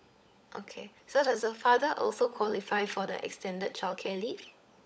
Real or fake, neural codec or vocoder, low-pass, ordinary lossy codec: fake; codec, 16 kHz, 16 kbps, FunCodec, trained on LibriTTS, 50 frames a second; none; none